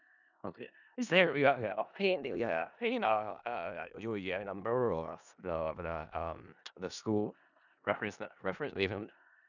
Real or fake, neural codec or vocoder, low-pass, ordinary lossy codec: fake; codec, 16 kHz in and 24 kHz out, 0.4 kbps, LongCat-Audio-Codec, four codebook decoder; 7.2 kHz; none